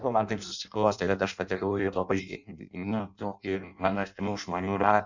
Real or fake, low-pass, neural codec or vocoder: fake; 7.2 kHz; codec, 16 kHz in and 24 kHz out, 0.6 kbps, FireRedTTS-2 codec